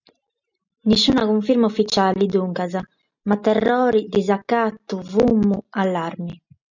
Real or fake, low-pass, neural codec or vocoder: real; 7.2 kHz; none